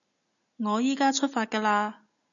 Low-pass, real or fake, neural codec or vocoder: 7.2 kHz; real; none